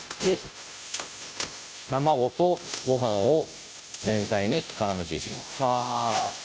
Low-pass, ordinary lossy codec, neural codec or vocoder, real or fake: none; none; codec, 16 kHz, 0.5 kbps, FunCodec, trained on Chinese and English, 25 frames a second; fake